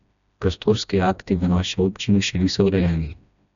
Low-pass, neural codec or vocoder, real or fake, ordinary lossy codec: 7.2 kHz; codec, 16 kHz, 1 kbps, FreqCodec, smaller model; fake; none